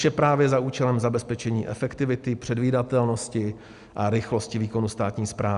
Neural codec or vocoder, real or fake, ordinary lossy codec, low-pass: none; real; Opus, 64 kbps; 10.8 kHz